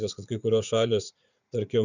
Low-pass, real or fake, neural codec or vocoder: 7.2 kHz; fake; vocoder, 44.1 kHz, 80 mel bands, Vocos